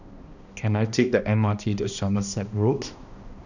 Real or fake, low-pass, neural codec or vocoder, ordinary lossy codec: fake; 7.2 kHz; codec, 16 kHz, 1 kbps, X-Codec, HuBERT features, trained on balanced general audio; none